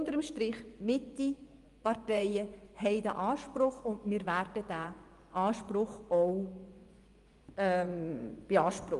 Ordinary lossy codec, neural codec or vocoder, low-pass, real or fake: Opus, 32 kbps; vocoder, 24 kHz, 100 mel bands, Vocos; 10.8 kHz; fake